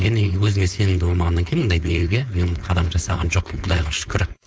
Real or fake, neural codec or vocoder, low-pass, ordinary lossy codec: fake; codec, 16 kHz, 4.8 kbps, FACodec; none; none